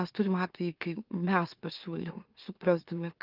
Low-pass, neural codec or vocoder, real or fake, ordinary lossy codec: 5.4 kHz; autoencoder, 44.1 kHz, a latent of 192 numbers a frame, MeloTTS; fake; Opus, 32 kbps